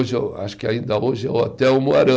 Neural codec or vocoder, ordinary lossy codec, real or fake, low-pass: none; none; real; none